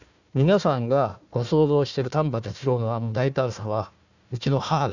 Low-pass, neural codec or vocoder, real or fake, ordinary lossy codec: 7.2 kHz; codec, 16 kHz, 1 kbps, FunCodec, trained on Chinese and English, 50 frames a second; fake; none